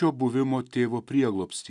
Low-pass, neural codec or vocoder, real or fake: 10.8 kHz; none; real